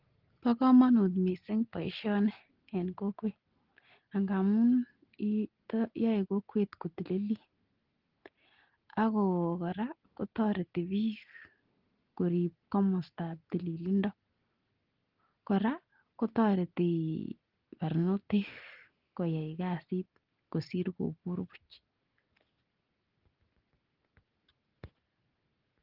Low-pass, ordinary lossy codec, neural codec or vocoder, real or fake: 5.4 kHz; Opus, 16 kbps; none; real